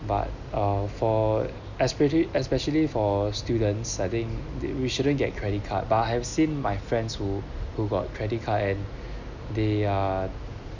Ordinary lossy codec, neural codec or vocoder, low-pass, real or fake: none; none; 7.2 kHz; real